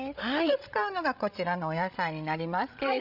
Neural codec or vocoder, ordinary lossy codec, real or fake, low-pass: codec, 16 kHz, 16 kbps, FreqCodec, larger model; none; fake; 5.4 kHz